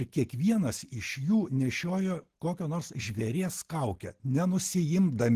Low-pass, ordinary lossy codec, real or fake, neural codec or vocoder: 14.4 kHz; Opus, 16 kbps; real; none